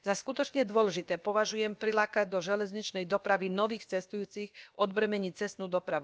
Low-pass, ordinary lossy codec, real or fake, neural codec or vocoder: none; none; fake; codec, 16 kHz, about 1 kbps, DyCAST, with the encoder's durations